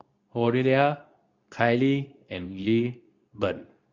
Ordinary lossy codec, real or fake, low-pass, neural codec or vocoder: Opus, 64 kbps; fake; 7.2 kHz; codec, 24 kHz, 0.9 kbps, WavTokenizer, medium speech release version 2